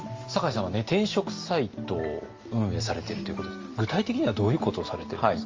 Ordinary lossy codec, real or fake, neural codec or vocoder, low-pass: Opus, 32 kbps; real; none; 7.2 kHz